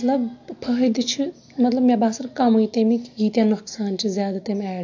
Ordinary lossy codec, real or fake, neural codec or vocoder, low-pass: AAC, 48 kbps; real; none; 7.2 kHz